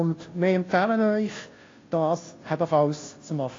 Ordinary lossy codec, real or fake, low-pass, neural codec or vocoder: AAC, 32 kbps; fake; 7.2 kHz; codec, 16 kHz, 0.5 kbps, FunCodec, trained on Chinese and English, 25 frames a second